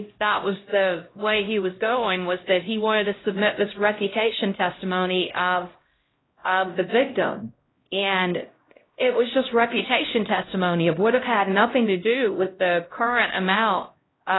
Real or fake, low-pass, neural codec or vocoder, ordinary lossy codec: fake; 7.2 kHz; codec, 16 kHz, 0.5 kbps, X-Codec, HuBERT features, trained on LibriSpeech; AAC, 16 kbps